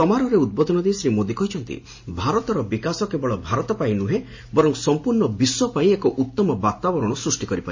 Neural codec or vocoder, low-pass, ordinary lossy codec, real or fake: none; 7.2 kHz; MP3, 32 kbps; real